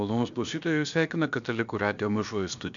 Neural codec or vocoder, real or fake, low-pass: codec, 16 kHz, about 1 kbps, DyCAST, with the encoder's durations; fake; 7.2 kHz